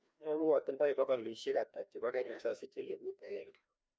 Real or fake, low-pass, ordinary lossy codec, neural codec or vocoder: fake; none; none; codec, 16 kHz, 1 kbps, FreqCodec, larger model